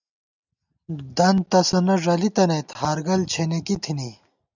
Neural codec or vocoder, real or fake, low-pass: none; real; 7.2 kHz